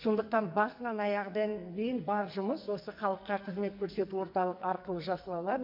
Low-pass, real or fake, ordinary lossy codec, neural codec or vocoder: 5.4 kHz; fake; MP3, 48 kbps; codec, 44.1 kHz, 2.6 kbps, SNAC